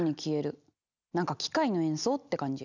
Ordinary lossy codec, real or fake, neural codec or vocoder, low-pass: none; real; none; 7.2 kHz